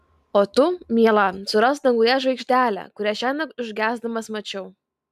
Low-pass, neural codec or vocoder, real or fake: 14.4 kHz; none; real